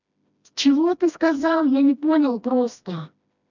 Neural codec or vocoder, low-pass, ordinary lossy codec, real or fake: codec, 16 kHz, 1 kbps, FreqCodec, smaller model; 7.2 kHz; none; fake